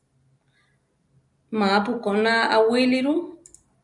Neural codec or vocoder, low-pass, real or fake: none; 10.8 kHz; real